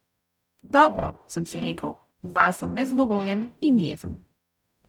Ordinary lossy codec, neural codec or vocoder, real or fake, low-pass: none; codec, 44.1 kHz, 0.9 kbps, DAC; fake; 19.8 kHz